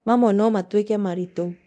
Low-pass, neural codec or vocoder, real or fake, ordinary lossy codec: none; codec, 24 kHz, 0.9 kbps, DualCodec; fake; none